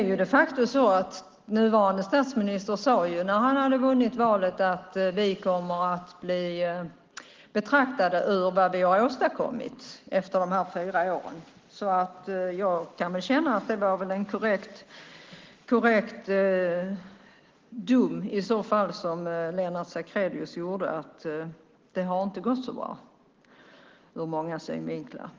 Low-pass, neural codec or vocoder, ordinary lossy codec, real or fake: 7.2 kHz; none; Opus, 16 kbps; real